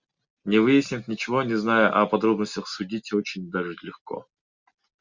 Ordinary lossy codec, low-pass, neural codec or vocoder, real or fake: Opus, 64 kbps; 7.2 kHz; none; real